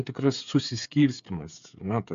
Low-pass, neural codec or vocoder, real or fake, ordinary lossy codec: 7.2 kHz; codec, 16 kHz, 8 kbps, FreqCodec, smaller model; fake; MP3, 64 kbps